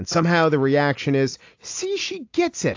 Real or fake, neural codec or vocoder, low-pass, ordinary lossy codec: real; none; 7.2 kHz; AAC, 48 kbps